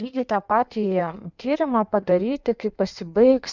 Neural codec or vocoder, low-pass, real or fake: codec, 16 kHz in and 24 kHz out, 1.1 kbps, FireRedTTS-2 codec; 7.2 kHz; fake